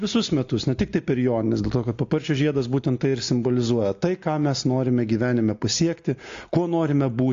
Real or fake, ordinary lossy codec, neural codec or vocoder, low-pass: real; AAC, 48 kbps; none; 7.2 kHz